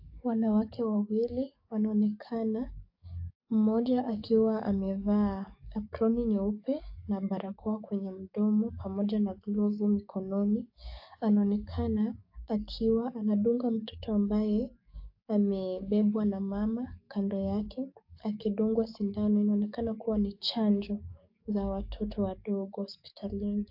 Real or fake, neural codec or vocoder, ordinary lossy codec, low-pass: fake; codec, 44.1 kHz, 7.8 kbps, DAC; AAC, 48 kbps; 5.4 kHz